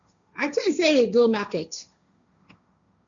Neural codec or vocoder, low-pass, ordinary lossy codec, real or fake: codec, 16 kHz, 1.1 kbps, Voila-Tokenizer; 7.2 kHz; MP3, 96 kbps; fake